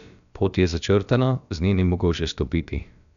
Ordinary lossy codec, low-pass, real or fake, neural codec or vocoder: none; 7.2 kHz; fake; codec, 16 kHz, about 1 kbps, DyCAST, with the encoder's durations